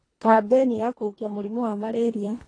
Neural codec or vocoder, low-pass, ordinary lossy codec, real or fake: codec, 24 kHz, 1.5 kbps, HILCodec; 9.9 kHz; AAC, 32 kbps; fake